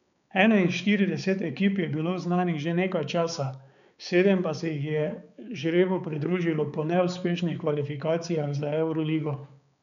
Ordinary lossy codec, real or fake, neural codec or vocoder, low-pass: none; fake; codec, 16 kHz, 4 kbps, X-Codec, HuBERT features, trained on balanced general audio; 7.2 kHz